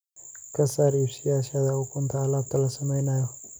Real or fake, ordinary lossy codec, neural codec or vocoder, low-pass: real; none; none; none